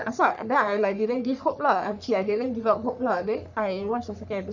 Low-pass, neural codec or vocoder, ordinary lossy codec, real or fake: 7.2 kHz; codec, 44.1 kHz, 3.4 kbps, Pupu-Codec; none; fake